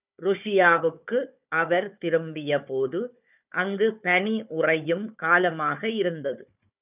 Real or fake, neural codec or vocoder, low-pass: fake; codec, 16 kHz, 4 kbps, FunCodec, trained on Chinese and English, 50 frames a second; 3.6 kHz